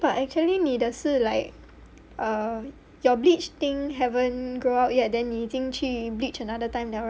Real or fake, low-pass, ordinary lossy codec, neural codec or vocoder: real; none; none; none